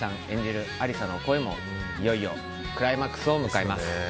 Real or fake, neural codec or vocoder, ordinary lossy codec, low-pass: real; none; none; none